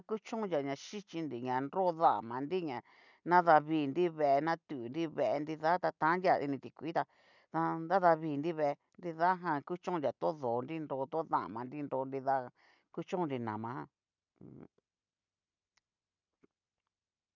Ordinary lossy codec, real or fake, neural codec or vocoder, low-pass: none; real; none; 7.2 kHz